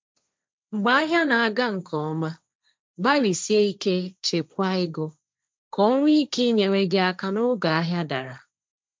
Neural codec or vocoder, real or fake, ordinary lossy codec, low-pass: codec, 16 kHz, 1.1 kbps, Voila-Tokenizer; fake; none; none